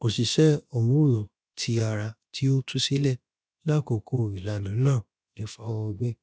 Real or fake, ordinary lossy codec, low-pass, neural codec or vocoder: fake; none; none; codec, 16 kHz, about 1 kbps, DyCAST, with the encoder's durations